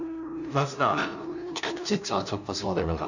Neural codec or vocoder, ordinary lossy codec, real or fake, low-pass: codec, 16 kHz, 0.5 kbps, FunCodec, trained on LibriTTS, 25 frames a second; none; fake; 7.2 kHz